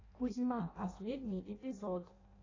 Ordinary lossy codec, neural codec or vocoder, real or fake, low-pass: AAC, 32 kbps; codec, 16 kHz in and 24 kHz out, 0.6 kbps, FireRedTTS-2 codec; fake; 7.2 kHz